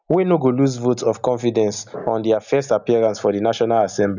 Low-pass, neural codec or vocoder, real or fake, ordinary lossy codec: 7.2 kHz; none; real; none